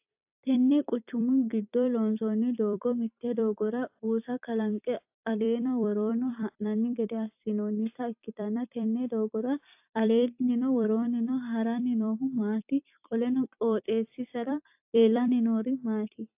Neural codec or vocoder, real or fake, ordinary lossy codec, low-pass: vocoder, 44.1 kHz, 128 mel bands every 256 samples, BigVGAN v2; fake; AAC, 32 kbps; 3.6 kHz